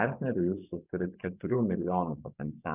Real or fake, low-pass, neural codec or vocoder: fake; 3.6 kHz; vocoder, 24 kHz, 100 mel bands, Vocos